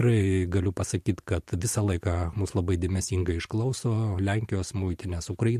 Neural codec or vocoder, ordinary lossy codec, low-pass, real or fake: vocoder, 44.1 kHz, 128 mel bands every 512 samples, BigVGAN v2; MP3, 64 kbps; 14.4 kHz; fake